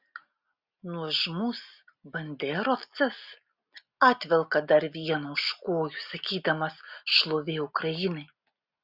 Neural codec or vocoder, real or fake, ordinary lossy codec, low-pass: none; real; Opus, 64 kbps; 5.4 kHz